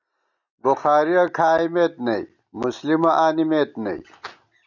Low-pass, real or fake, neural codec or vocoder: 7.2 kHz; real; none